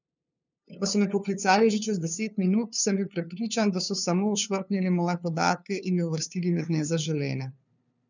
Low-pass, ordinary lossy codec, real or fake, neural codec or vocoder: 7.2 kHz; none; fake; codec, 16 kHz, 2 kbps, FunCodec, trained on LibriTTS, 25 frames a second